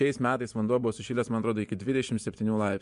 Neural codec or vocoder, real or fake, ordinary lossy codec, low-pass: vocoder, 24 kHz, 100 mel bands, Vocos; fake; MP3, 64 kbps; 10.8 kHz